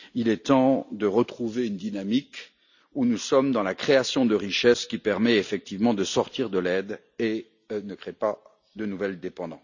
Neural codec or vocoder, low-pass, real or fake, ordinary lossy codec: none; 7.2 kHz; real; none